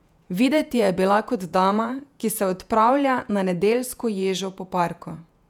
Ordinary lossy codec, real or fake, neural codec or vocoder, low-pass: none; fake; vocoder, 48 kHz, 128 mel bands, Vocos; 19.8 kHz